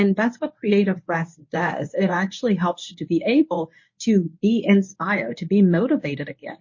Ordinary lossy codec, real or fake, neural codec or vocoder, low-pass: MP3, 32 kbps; fake; codec, 24 kHz, 0.9 kbps, WavTokenizer, medium speech release version 1; 7.2 kHz